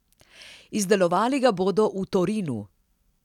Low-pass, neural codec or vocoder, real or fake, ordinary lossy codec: 19.8 kHz; none; real; none